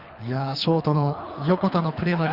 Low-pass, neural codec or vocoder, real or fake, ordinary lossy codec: 5.4 kHz; codec, 24 kHz, 3 kbps, HILCodec; fake; Opus, 64 kbps